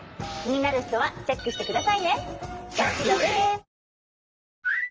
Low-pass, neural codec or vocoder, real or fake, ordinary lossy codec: 7.2 kHz; vocoder, 44.1 kHz, 128 mel bands, Pupu-Vocoder; fake; Opus, 24 kbps